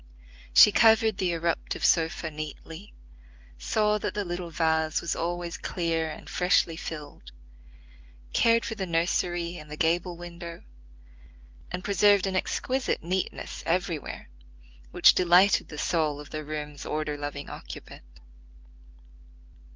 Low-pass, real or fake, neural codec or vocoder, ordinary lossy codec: 7.2 kHz; real; none; Opus, 32 kbps